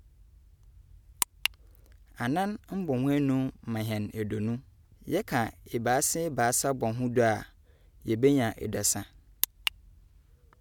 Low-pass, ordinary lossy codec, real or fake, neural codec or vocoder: 19.8 kHz; none; real; none